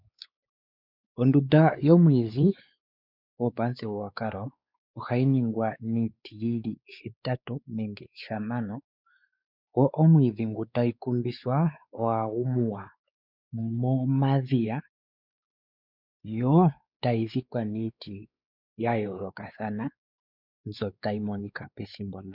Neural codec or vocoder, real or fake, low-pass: codec, 16 kHz, 4 kbps, X-Codec, WavLM features, trained on Multilingual LibriSpeech; fake; 5.4 kHz